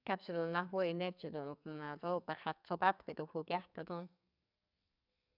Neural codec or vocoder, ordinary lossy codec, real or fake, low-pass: codec, 44.1 kHz, 2.6 kbps, SNAC; none; fake; 5.4 kHz